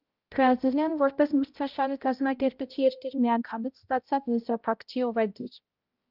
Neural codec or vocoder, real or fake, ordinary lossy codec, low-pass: codec, 16 kHz, 0.5 kbps, X-Codec, HuBERT features, trained on balanced general audio; fake; Opus, 24 kbps; 5.4 kHz